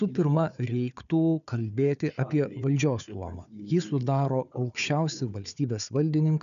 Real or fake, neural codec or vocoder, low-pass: fake; codec, 16 kHz, 4 kbps, FunCodec, trained on Chinese and English, 50 frames a second; 7.2 kHz